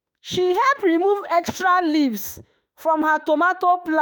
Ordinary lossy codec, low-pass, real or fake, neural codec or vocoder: none; none; fake; autoencoder, 48 kHz, 32 numbers a frame, DAC-VAE, trained on Japanese speech